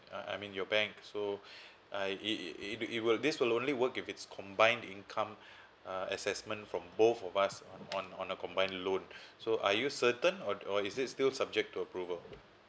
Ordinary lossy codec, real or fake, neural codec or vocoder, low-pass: none; real; none; none